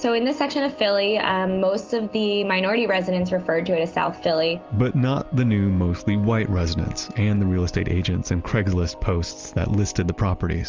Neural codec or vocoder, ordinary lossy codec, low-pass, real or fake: none; Opus, 32 kbps; 7.2 kHz; real